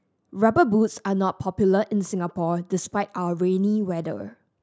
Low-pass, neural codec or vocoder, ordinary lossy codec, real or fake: none; none; none; real